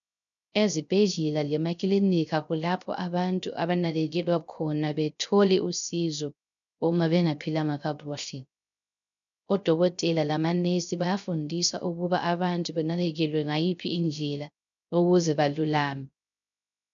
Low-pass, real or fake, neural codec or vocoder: 7.2 kHz; fake; codec, 16 kHz, 0.3 kbps, FocalCodec